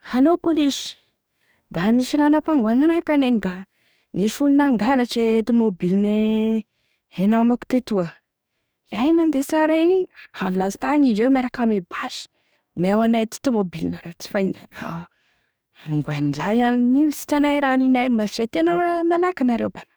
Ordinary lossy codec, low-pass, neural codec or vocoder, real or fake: none; none; codec, 44.1 kHz, 2.6 kbps, DAC; fake